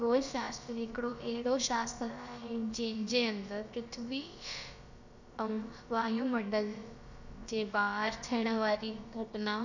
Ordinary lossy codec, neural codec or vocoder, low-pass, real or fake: none; codec, 16 kHz, about 1 kbps, DyCAST, with the encoder's durations; 7.2 kHz; fake